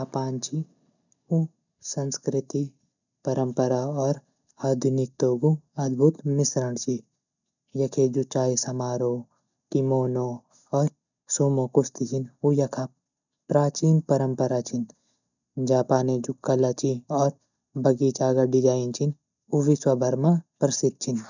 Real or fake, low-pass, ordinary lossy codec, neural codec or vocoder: real; 7.2 kHz; none; none